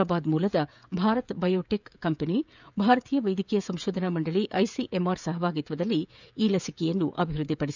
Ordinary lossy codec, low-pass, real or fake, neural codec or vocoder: none; 7.2 kHz; fake; codec, 16 kHz, 16 kbps, FreqCodec, smaller model